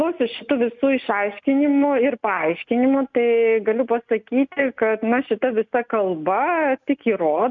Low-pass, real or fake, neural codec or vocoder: 7.2 kHz; real; none